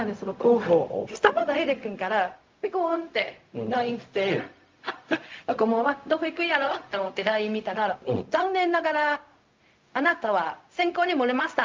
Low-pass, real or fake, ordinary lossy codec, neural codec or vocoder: 7.2 kHz; fake; Opus, 24 kbps; codec, 16 kHz, 0.4 kbps, LongCat-Audio-Codec